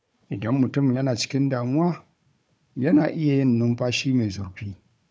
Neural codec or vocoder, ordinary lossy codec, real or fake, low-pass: codec, 16 kHz, 4 kbps, FunCodec, trained on Chinese and English, 50 frames a second; none; fake; none